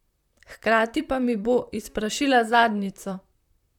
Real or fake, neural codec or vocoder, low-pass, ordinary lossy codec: fake; vocoder, 44.1 kHz, 128 mel bands, Pupu-Vocoder; 19.8 kHz; none